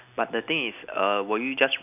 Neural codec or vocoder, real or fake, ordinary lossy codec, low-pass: none; real; none; 3.6 kHz